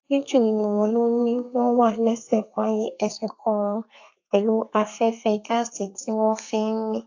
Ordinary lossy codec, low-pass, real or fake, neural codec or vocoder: AAC, 48 kbps; 7.2 kHz; fake; codec, 32 kHz, 1.9 kbps, SNAC